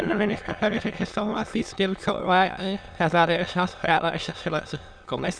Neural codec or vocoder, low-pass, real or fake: autoencoder, 22.05 kHz, a latent of 192 numbers a frame, VITS, trained on many speakers; 9.9 kHz; fake